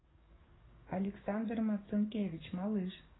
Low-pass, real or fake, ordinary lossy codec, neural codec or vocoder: 7.2 kHz; fake; AAC, 16 kbps; codec, 44.1 kHz, 7.8 kbps, DAC